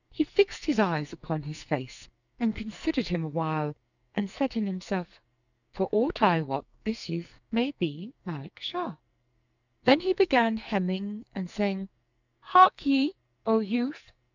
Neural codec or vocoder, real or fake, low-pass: codec, 44.1 kHz, 2.6 kbps, SNAC; fake; 7.2 kHz